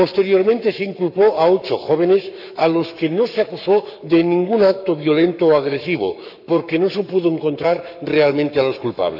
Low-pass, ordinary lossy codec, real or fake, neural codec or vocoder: 5.4 kHz; none; fake; codec, 44.1 kHz, 7.8 kbps, Pupu-Codec